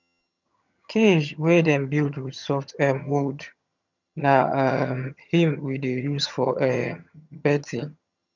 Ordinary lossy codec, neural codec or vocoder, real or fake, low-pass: none; vocoder, 22.05 kHz, 80 mel bands, HiFi-GAN; fake; 7.2 kHz